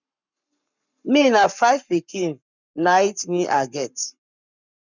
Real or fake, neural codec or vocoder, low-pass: fake; codec, 44.1 kHz, 7.8 kbps, Pupu-Codec; 7.2 kHz